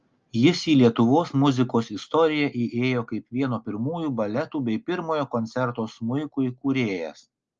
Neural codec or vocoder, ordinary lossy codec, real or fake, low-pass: none; Opus, 24 kbps; real; 7.2 kHz